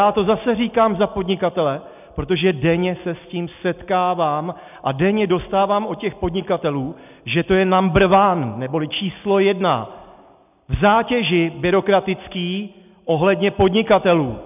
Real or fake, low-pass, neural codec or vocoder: real; 3.6 kHz; none